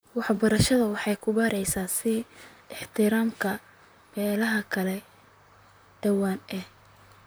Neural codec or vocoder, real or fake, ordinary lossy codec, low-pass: vocoder, 44.1 kHz, 128 mel bands, Pupu-Vocoder; fake; none; none